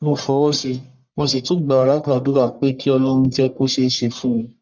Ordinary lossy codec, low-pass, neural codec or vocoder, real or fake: none; 7.2 kHz; codec, 44.1 kHz, 1.7 kbps, Pupu-Codec; fake